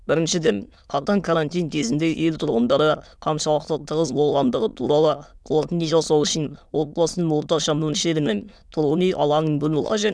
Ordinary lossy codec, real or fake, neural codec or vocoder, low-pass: none; fake; autoencoder, 22.05 kHz, a latent of 192 numbers a frame, VITS, trained on many speakers; none